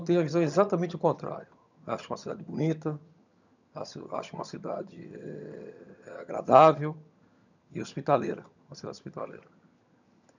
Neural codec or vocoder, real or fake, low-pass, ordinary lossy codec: vocoder, 22.05 kHz, 80 mel bands, HiFi-GAN; fake; 7.2 kHz; none